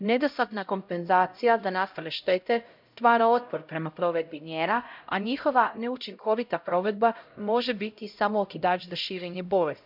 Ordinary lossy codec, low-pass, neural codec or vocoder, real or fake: none; 5.4 kHz; codec, 16 kHz, 0.5 kbps, X-Codec, HuBERT features, trained on LibriSpeech; fake